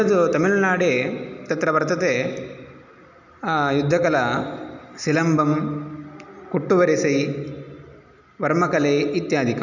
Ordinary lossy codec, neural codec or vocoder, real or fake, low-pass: none; none; real; 7.2 kHz